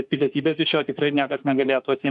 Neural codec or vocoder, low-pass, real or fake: autoencoder, 48 kHz, 32 numbers a frame, DAC-VAE, trained on Japanese speech; 10.8 kHz; fake